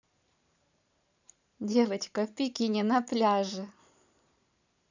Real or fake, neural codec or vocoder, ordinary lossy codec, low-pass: fake; vocoder, 44.1 kHz, 128 mel bands every 512 samples, BigVGAN v2; none; 7.2 kHz